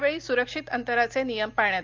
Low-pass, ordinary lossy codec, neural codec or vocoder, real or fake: 7.2 kHz; Opus, 24 kbps; none; real